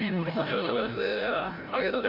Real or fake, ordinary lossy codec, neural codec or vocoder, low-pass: fake; MP3, 48 kbps; codec, 16 kHz, 0.5 kbps, FreqCodec, larger model; 5.4 kHz